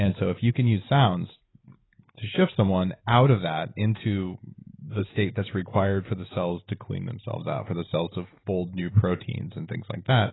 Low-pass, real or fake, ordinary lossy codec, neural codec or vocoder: 7.2 kHz; real; AAC, 16 kbps; none